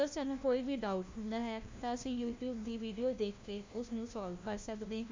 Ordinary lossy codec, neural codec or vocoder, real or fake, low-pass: MP3, 64 kbps; codec, 16 kHz, 1 kbps, FunCodec, trained on LibriTTS, 50 frames a second; fake; 7.2 kHz